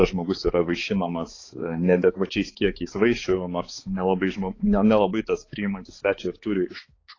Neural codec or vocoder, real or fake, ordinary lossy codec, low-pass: codec, 16 kHz, 4 kbps, X-Codec, HuBERT features, trained on balanced general audio; fake; AAC, 32 kbps; 7.2 kHz